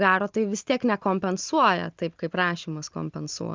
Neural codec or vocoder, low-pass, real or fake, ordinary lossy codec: none; 7.2 kHz; real; Opus, 24 kbps